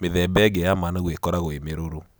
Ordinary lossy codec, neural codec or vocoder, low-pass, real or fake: none; none; none; real